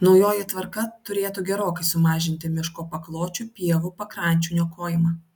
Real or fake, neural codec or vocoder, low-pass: real; none; 19.8 kHz